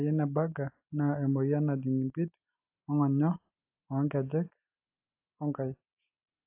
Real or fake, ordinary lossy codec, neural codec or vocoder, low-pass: real; AAC, 32 kbps; none; 3.6 kHz